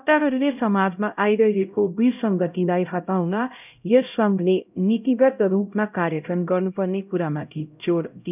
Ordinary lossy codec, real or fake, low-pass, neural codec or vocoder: none; fake; 3.6 kHz; codec, 16 kHz, 0.5 kbps, X-Codec, HuBERT features, trained on LibriSpeech